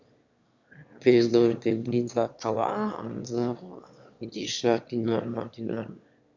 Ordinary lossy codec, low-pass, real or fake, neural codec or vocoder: Opus, 64 kbps; 7.2 kHz; fake; autoencoder, 22.05 kHz, a latent of 192 numbers a frame, VITS, trained on one speaker